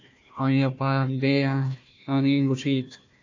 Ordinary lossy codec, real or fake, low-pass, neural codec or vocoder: AAC, 48 kbps; fake; 7.2 kHz; codec, 16 kHz, 1 kbps, FunCodec, trained on Chinese and English, 50 frames a second